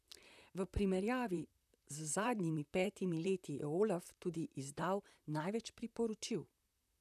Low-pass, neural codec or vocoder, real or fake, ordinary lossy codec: 14.4 kHz; vocoder, 44.1 kHz, 128 mel bands, Pupu-Vocoder; fake; none